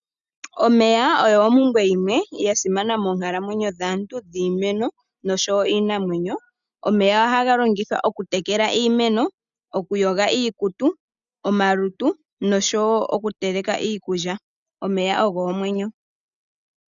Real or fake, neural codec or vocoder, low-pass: real; none; 7.2 kHz